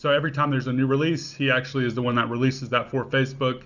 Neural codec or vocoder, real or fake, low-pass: none; real; 7.2 kHz